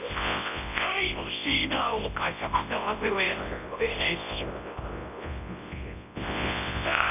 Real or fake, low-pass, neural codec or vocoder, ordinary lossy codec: fake; 3.6 kHz; codec, 24 kHz, 0.9 kbps, WavTokenizer, large speech release; none